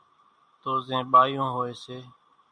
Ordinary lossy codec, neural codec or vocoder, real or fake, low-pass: MP3, 96 kbps; none; real; 9.9 kHz